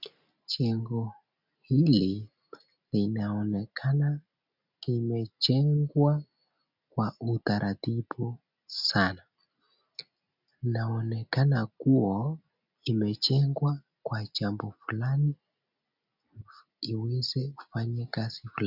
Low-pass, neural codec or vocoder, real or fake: 5.4 kHz; none; real